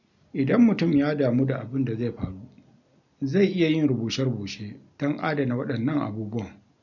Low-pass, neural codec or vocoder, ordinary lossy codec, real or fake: 7.2 kHz; none; none; real